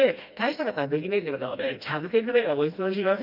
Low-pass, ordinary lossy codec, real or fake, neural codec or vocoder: 5.4 kHz; none; fake; codec, 16 kHz, 1 kbps, FreqCodec, smaller model